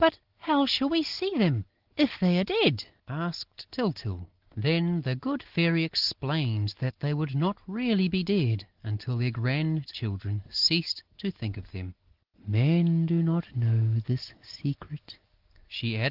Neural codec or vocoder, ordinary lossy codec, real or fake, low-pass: none; Opus, 16 kbps; real; 5.4 kHz